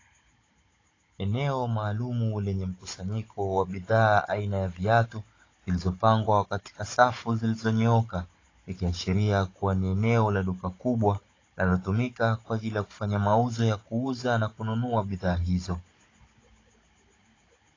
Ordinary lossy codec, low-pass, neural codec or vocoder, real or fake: AAC, 32 kbps; 7.2 kHz; codec, 16 kHz, 16 kbps, FunCodec, trained on Chinese and English, 50 frames a second; fake